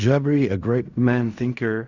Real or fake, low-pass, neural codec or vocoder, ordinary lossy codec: fake; 7.2 kHz; codec, 16 kHz in and 24 kHz out, 0.4 kbps, LongCat-Audio-Codec, fine tuned four codebook decoder; Opus, 64 kbps